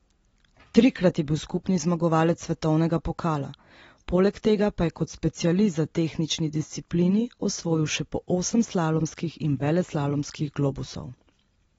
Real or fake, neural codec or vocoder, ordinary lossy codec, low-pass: real; none; AAC, 24 kbps; 19.8 kHz